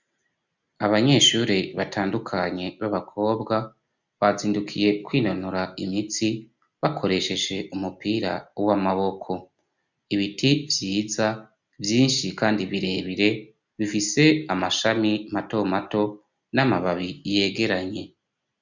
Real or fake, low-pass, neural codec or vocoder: real; 7.2 kHz; none